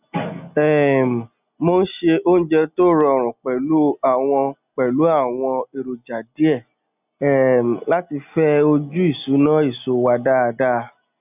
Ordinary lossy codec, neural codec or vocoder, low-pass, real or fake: none; none; 3.6 kHz; real